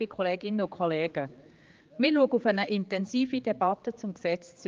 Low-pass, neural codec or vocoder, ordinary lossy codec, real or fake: 7.2 kHz; codec, 16 kHz, 4 kbps, X-Codec, HuBERT features, trained on general audio; Opus, 32 kbps; fake